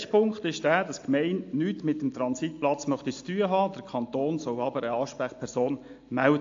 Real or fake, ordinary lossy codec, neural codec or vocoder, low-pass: real; AAC, 48 kbps; none; 7.2 kHz